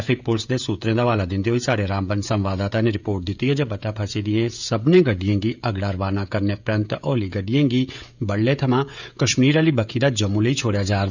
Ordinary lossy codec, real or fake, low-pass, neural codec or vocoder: none; fake; 7.2 kHz; codec, 16 kHz, 16 kbps, FreqCodec, smaller model